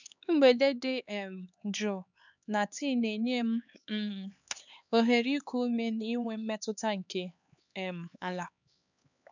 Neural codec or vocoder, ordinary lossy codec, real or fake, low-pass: codec, 16 kHz, 4 kbps, X-Codec, HuBERT features, trained on LibriSpeech; none; fake; 7.2 kHz